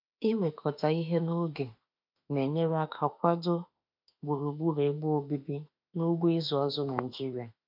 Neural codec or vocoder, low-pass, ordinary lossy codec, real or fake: autoencoder, 48 kHz, 32 numbers a frame, DAC-VAE, trained on Japanese speech; 5.4 kHz; none; fake